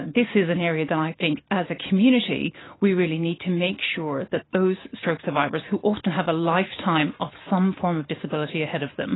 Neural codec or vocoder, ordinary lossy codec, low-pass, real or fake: none; AAC, 16 kbps; 7.2 kHz; real